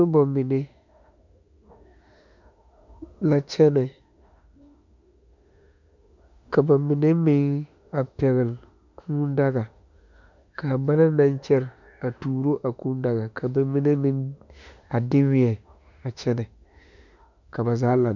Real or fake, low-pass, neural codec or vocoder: fake; 7.2 kHz; autoencoder, 48 kHz, 32 numbers a frame, DAC-VAE, trained on Japanese speech